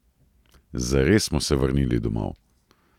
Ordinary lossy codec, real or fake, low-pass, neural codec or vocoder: none; real; 19.8 kHz; none